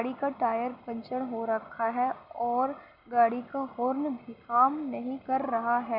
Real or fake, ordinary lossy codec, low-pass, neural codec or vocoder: real; none; 5.4 kHz; none